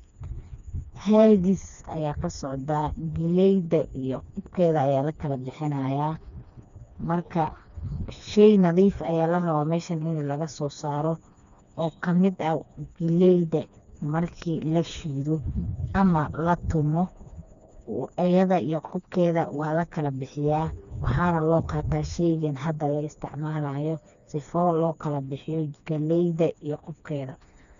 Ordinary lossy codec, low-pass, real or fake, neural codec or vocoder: none; 7.2 kHz; fake; codec, 16 kHz, 2 kbps, FreqCodec, smaller model